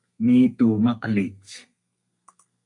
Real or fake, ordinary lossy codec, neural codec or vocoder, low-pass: fake; MP3, 96 kbps; codec, 32 kHz, 1.9 kbps, SNAC; 10.8 kHz